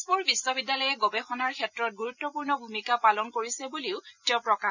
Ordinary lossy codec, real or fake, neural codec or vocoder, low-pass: none; real; none; 7.2 kHz